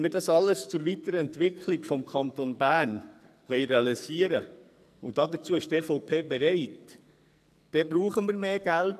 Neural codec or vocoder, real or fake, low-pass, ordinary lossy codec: codec, 44.1 kHz, 2.6 kbps, SNAC; fake; 14.4 kHz; none